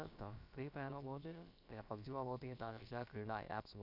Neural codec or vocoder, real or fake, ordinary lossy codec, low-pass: codec, 16 kHz, about 1 kbps, DyCAST, with the encoder's durations; fake; none; 5.4 kHz